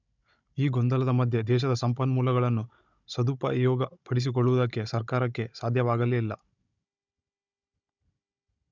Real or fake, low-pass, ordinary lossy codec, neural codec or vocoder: fake; 7.2 kHz; none; codec, 16 kHz, 16 kbps, FunCodec, trained on Chinese and English, 50 frames a second